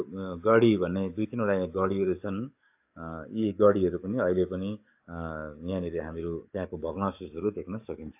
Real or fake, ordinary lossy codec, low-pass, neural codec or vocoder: fake; AAC, 32 kbps; 3.6 kHz; codec, 44.1 kHz, 7.8 kbps, DAC